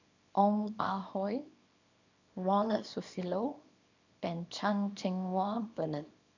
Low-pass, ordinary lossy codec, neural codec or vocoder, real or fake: 7.2 kHz; none; codec, 24 kHz, 0.9 kbps, WavTokenizer, small release; fake